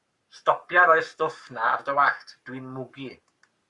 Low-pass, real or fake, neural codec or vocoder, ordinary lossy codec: 10.8 kHz; fake; codec, 44.1 kHz, 7.8 kbps, Pupu-Codec; Opus, 64 kbps